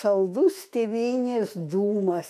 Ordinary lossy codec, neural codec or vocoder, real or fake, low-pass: AAC, 96 kbps; autoencoder, 48 kHz, 32 numbers a frame, DAC-VAE, trained on Japanese speech; fake; 14.4 kHz